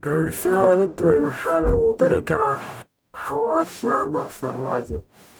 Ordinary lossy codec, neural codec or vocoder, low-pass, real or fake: none; codec, 44.1 kHz, 0.9 kbps, DAC; none; fake